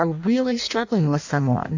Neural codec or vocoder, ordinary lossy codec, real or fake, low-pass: codec, 16 kHz in and 24 kHz out, 0.6 kbps, FireRedTTS-2 codec; AAC, 48 kbps; fake; 7.2 kHz